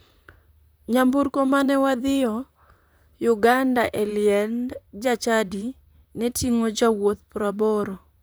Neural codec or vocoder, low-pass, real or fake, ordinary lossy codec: vocoder, 44.1 kHz, 128 mel bands, Pupu-Vocoder; none; fake; none